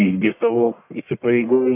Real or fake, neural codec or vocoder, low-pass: fake; codec, 44.1 kHz, 1.7 kbps, Pupu-Codec; 3.6 kHz